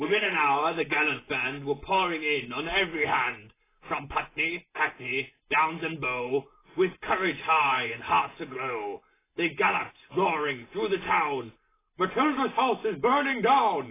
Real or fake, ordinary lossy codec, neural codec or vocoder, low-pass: real; AAC, 16 kbps; none; 3.6 kHz